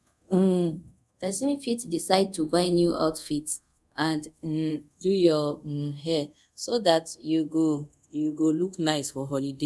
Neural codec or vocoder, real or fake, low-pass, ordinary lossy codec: codec, 24 kHz, 0.5 kbps, DualCodec; fake; none; none